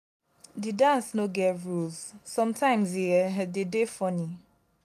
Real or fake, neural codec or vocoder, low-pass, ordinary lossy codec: real; none; 14.4 kHz; none